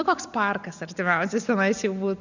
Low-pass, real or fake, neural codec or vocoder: 7.2 kHz; real; none